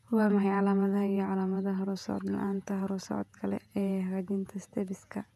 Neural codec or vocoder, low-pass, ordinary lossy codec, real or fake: vocoder, 48 kHz, 128 mel bands, Vocos; 14.4 kHz; none; fake